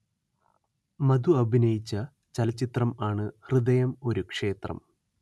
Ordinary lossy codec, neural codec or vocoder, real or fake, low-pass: none; none; real; none